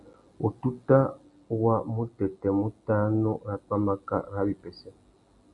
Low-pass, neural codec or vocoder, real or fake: 10.8 kHz; none; real